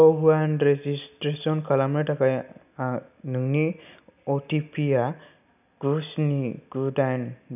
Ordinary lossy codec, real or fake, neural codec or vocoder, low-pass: none; real; none; 3.6 kHz